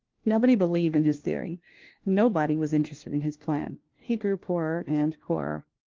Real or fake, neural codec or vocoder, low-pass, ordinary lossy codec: fake; codec, 16 kHz, 1 kbps, FunCodec, trained on LibriTTS, 50 frames a second; 7.2 kHz; Opus, 16 kbps